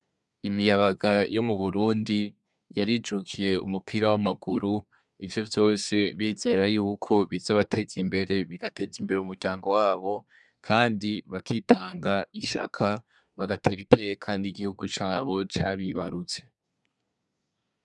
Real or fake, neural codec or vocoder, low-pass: fake; codec, 24 kHz, 1 kbps, SNAC; 10.8 kHz